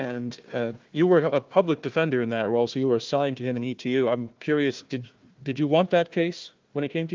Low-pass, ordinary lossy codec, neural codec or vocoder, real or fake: 7.2 kHz; Opus, 32 kbps; codec, 16 kHz, 1 kbps, FunCodec, trained on Chinese and English, 50 frames a second; fake